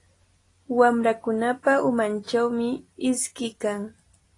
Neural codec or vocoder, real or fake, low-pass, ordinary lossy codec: none; real; 10.8 kHz; AAC, 32 kbps